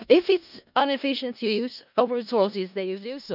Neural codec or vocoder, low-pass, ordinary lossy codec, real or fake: codec, 16 kHz in and 24 kHz out, 0.4 kbps, LongCat-Audio-Codec, four codebook decoder; 5.4 kHz; none; fake